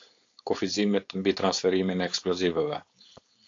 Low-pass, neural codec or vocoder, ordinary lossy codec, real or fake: 7.2 kHz; codec, 16 kHz, 4.8 kbps, FACodec; AAC, 48 kbps; fake